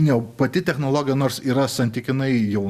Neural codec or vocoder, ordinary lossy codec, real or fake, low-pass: none; Opus, 64 kbps; real; 14.4 kHz